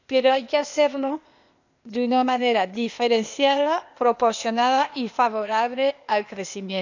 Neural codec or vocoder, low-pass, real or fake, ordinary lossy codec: codec, 16 kHz, 0.8 kbps, ZipCodec; 7.2 kHz; fake; none